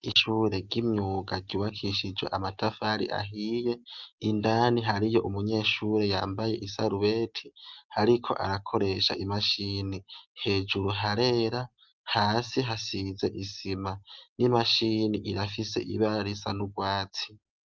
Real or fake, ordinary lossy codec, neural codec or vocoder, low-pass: real; Opus, 24 kbps; none; 7.2 kHz